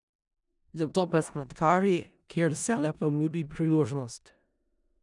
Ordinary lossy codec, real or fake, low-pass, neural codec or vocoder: none; fake; 10.8 kHz; codec, 16 kHz in and 24 kHz out, 0.4 kbps, LongCat-Audio-Codec, four codebook decoder